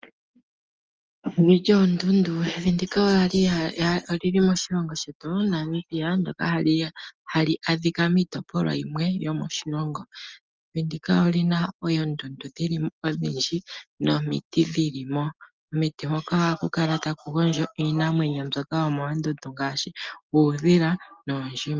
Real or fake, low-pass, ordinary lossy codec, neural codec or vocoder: real; 7.2 kHz; Opus, 24 kbps; none